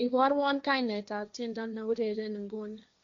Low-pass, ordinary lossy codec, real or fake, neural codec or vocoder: 7.2 kHz; MP3, 64 kbps; fake; codec, 16 kHz, 1.1 kbps, Voila-Tokenizer